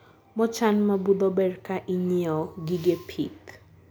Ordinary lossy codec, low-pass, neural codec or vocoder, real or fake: none; none; none; real